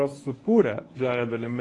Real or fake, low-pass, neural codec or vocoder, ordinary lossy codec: fake; 10.8 kHz; codec, 24 kHz, 0.9 kbps, WavTokenizer, medium speech release version 1; AAC, 32 kbps